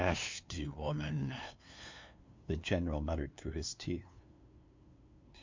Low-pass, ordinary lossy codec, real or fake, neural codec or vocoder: 7.2 kHz; MP3, 48 kbps; fake; codec, 16 kHz, 2 kbps, FunCodec, trained on LibriTTS, 25 frames a second